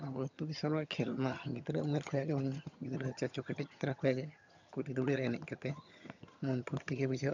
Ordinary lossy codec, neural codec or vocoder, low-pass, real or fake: none; vocoder, 22.05 kHz, 80 mel bands, HiFi-GAN; 7.2 kHz; fake